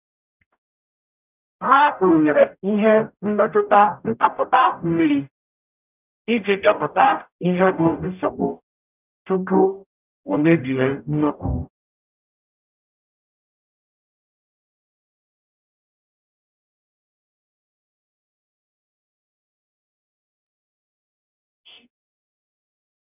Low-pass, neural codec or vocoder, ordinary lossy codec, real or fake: 3.6 kHz; codec, 44.1 kHz, 0.9 kbps, DAC; none; fake